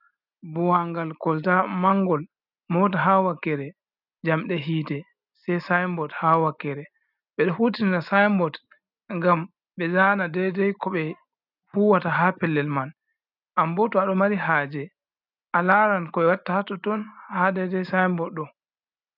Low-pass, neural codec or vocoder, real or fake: 5.4 kHz; none; real